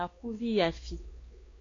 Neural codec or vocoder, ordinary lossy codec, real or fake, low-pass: codec, 16 kHz, 2 kbps, X-Codec, WavLM features, trained on Multilingual LibriSpeech; AAC, 32 kbps; fake; 7.2 kHz